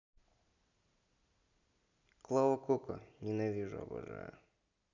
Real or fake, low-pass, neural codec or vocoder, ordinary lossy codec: real; 7.2 kHz; none; none